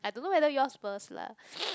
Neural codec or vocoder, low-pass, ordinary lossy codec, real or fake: none; none; none; real